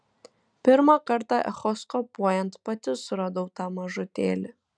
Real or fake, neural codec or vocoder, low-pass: real; none; 9.9 kHz